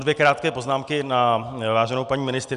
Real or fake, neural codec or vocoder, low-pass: real; none; 10.8 kHz